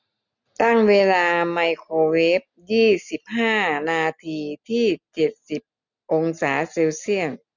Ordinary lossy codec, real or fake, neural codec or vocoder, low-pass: none; real; none; 7.2 kHz